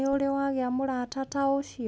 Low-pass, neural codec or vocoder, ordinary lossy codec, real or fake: none; none; none; real